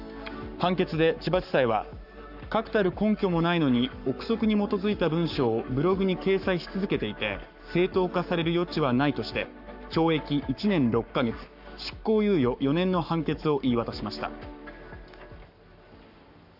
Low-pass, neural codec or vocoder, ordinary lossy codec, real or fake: 5.4 kHz; codec, 44.1 kHz, 7.8 kbps, Pupu-Codec; AAC, 48 kbps; fake